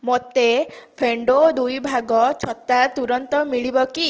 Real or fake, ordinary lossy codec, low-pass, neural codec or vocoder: real; Opus, 16 kbps; 7.2 kHz; none